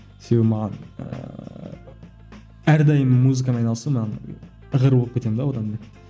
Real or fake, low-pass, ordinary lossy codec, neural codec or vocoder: real; none; none; none